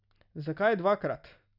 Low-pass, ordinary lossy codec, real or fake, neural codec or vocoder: 5.4 kHz; none; real; none